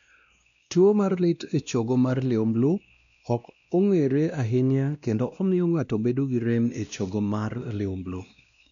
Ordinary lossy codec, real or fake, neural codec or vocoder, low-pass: none; fake; codec, 16 kHz, 1 kbps, X-Codec, WavLM features, trained on Multilingual LibriSpeech; 7.2 kHz